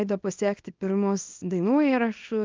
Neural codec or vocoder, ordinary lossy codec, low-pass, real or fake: codec, 24 kHz, 0.9 kbps, WavTokenizer, small release; Opus, 32 kbps; 7.2 kHz; fake